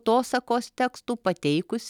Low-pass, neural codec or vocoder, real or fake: 19.8 kHz; none; real